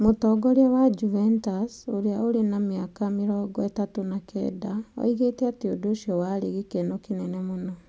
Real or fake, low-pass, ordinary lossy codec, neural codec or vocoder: real; none; none; none